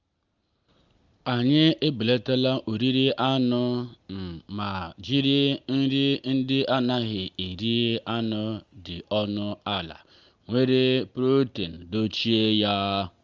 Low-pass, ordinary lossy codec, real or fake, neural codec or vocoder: 7.2 kHz; Opus, 32 kbps; real; none